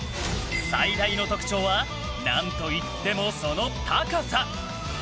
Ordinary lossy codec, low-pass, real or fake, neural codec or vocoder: none; none; real; none